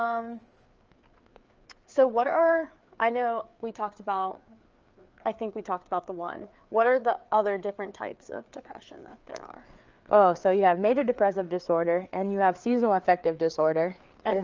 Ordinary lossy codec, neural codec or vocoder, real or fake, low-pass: Opus, 24 kbps; codec, 16 kHz, 4 kbps, FreqCodec, larger model; fake; 7.2 kHz